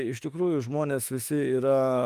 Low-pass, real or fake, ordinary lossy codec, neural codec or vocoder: 14.4 kHz; fake; Opus, 32 kbps; autoencoder, 48 kHz, 32 numbers a frame, DAC-VAE, trained on Japanese speech